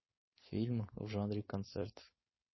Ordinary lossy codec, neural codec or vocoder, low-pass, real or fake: MP3, 24 kbps; codec, 16 kHz, 4.8 kbps, FACodec; 7.2 kHz; fake